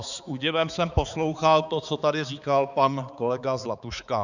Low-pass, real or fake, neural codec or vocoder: 7.2 kHz; fake; codec, 16 kHz, 4 kbps, X-Codec, HuBERT features, trained on balanced general audio